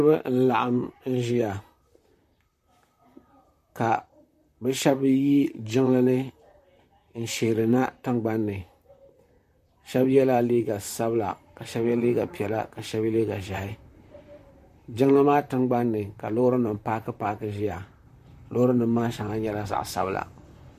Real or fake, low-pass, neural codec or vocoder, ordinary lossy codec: fake; 14.4 kHz; vocoder, 44.1 kHz, 128 mel bands, Pupu-Vocoder; MP3, 64 kbps